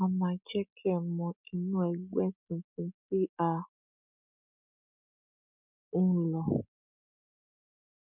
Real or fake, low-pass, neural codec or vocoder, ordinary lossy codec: real; 3.6 kHz; none; none